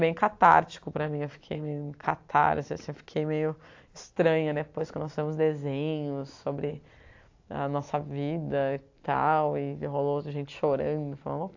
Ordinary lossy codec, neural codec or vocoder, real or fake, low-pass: AAC, 48 kbps; none; real; 7.2 kHz